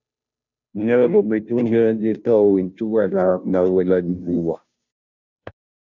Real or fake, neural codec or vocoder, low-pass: fake; codec, 16 kHz, 0.5 kbps, FunCodec, trained on Chinese and English, 25 frames a second; 7.2 kHz